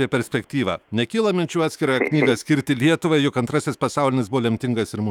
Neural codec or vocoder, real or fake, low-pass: autoencoder, 48 kHz, 128 numbers a frame, DAC-VAE, trained on Japanese speech; fake; 19.8 kHz